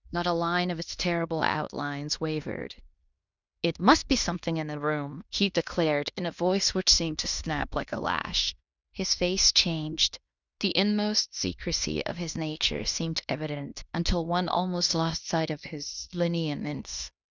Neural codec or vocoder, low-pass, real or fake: codec, 16 kHz in and 24 kHz out, 0.9 kbps, LongCat-Audio-Codec, fine tuned four codebook decoder; 7.2 kHz; fake